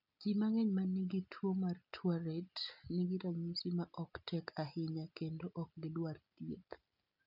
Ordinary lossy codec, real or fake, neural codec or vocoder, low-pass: none; real; none; 5.4 kHz